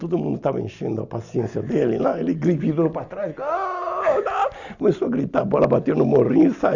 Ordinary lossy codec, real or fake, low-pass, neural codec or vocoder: Opus, 64 kbps; real; 7.2 kHz; none